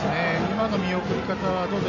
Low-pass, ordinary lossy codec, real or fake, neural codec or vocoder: 7.2 kHz; none; real; none